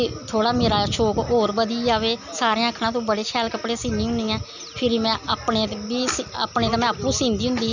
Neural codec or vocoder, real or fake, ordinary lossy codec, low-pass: none; real; none; 7.2 kHz